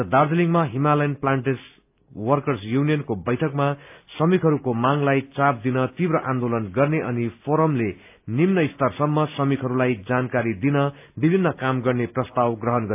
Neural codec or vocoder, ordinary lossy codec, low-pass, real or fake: none; AAC, 32 kbps; 3.6 kHz; real